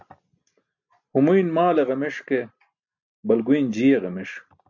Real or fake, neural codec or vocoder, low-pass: real; none; 7.2 kHz